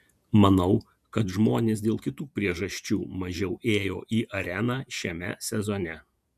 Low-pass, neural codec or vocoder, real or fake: 14.4 kHz; vocoder, 48 kHz, 128 mel bands, Vocos; fake